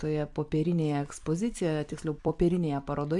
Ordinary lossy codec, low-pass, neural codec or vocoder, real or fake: AAC, 64 kbps; 10.8 kHz; vocoder, 44.1 kHz, 128 mel bands every 256 samples, BigVGAN v2; fake